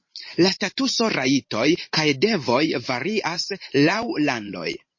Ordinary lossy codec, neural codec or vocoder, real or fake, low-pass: MP3, 32 kbps; vocoder, 44.1 kHz, 128 mel bands every 512 samples, BigVGAN v2; fake; 7.2 kHz